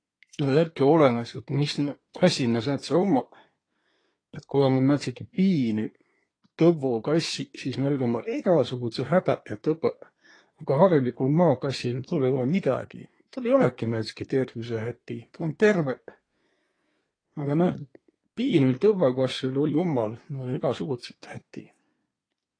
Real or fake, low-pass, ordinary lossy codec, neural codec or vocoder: fake; 9.9 kHz; AAC, 32 kbps; codec, 24 kHz, 1 kbps, SNAC